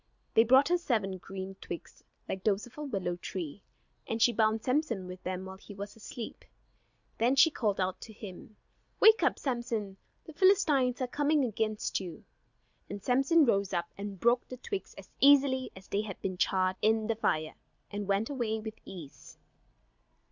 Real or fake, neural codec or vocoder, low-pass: real; none; 7.2 kHz